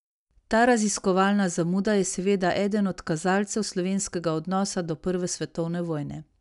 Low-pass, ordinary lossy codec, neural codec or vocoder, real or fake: 10.8 kHz; none; none; real